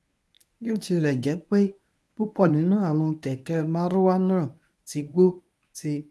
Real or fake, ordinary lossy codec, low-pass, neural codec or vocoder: fake; none; none; codec, 24 kHz, 0.9 kbps, WavTokenizer, medium speech release version 1